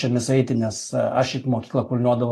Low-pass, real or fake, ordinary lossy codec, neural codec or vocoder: 14.4 kHz; real; AAC, 48 kbps; none